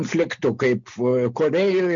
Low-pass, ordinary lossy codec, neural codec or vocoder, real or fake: 7.2 kHz; MP3, 64 kbps; none; real